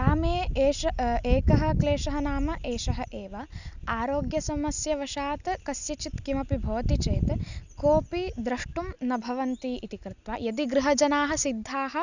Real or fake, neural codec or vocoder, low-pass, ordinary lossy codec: real; none; 7.2 kHz; none